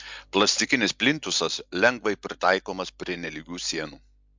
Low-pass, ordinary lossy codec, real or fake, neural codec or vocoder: 7.2 kHz; MP3, 64 kbps; real; none